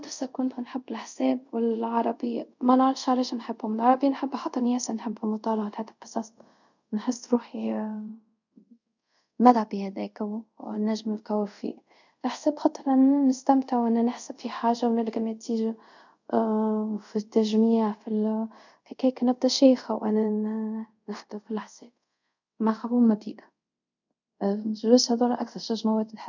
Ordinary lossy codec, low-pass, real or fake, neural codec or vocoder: none; 7.2 kHz; fake; codec, 24 kHz, 0.5 kbps, DualCodec